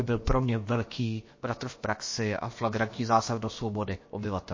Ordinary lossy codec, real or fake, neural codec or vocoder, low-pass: MP3, 32 kbps; fake; codec, 16 kHz, about 1 kbps, DyCAST, with the encoder's durations; 7.2 kHz